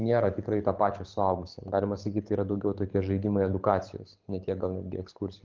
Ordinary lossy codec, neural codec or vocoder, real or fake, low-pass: Opus, 24 kbps; codec, 24 kHz, 6 kbps, HILCodec; fake; 7.2 kHz